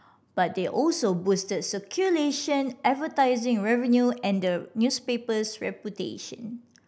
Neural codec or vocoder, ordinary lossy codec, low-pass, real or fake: none; none; none; real